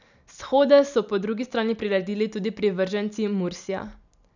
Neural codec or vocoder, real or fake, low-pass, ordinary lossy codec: none; real; 7.2 kHz; none